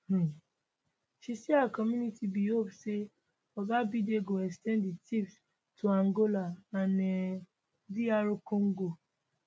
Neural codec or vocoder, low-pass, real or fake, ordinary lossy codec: none; none; real; none